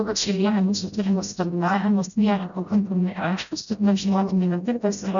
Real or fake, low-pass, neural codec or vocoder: fake; 7.2 kHz; codec, 16 kHz, 0.5 kbps, FreqCodec, smaller model